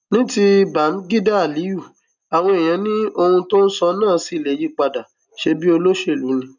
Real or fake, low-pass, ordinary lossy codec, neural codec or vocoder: real; 7.2 kHz; none; none